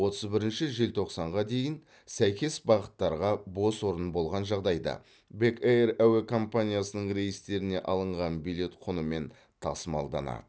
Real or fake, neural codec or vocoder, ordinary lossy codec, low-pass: real; none; none; none